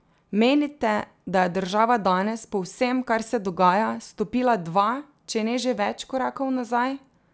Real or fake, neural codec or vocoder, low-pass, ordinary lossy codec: real; none; none; none